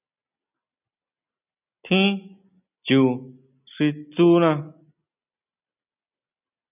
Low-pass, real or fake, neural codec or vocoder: 3.6 kHz; real; none